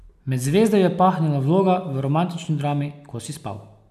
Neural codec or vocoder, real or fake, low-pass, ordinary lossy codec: none; real; 14.4 kHz; none